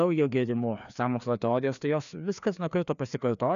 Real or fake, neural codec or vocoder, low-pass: fake; codec, 16 kHz, 2 kbps, FreqCodec, larger model; 7.2 kHz